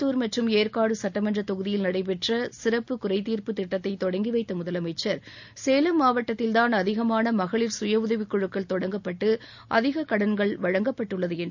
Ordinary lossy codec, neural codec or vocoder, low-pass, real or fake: none; none; 7.2 kHz; real